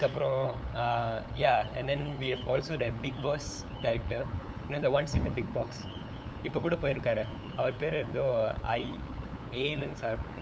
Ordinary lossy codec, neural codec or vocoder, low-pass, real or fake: none; codec, 16 kHz, 8 kbps, FunCodec, trained on LibriTTS, 25 frames a second; none; fake